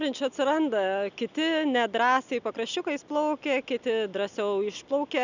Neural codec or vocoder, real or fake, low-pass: none; real; 7.2 kHz